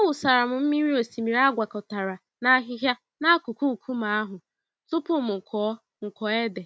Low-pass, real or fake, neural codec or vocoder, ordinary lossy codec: none; real; none; none